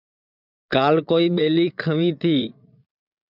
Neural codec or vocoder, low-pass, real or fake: vocoder, 22.05 kHz, 80 mel bands, Vocos; 5.4 kHz; fake